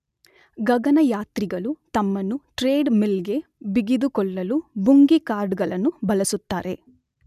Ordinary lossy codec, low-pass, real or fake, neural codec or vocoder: none; 14.4 kHz; real; none